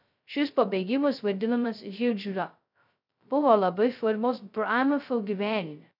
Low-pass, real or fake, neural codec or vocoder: 5.4 kHz; fake; codec, 16 kHz, 0.2 kbps, FocalCodec